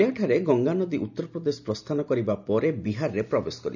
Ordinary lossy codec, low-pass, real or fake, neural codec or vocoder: none; 7.2 kHz; real; none